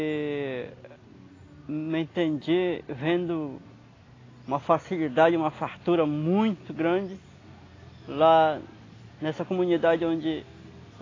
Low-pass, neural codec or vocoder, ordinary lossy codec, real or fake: 7.2 kHz; none; AAC, 32 kbps; real